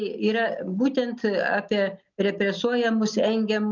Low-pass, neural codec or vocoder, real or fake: 7.2 kHz; none; real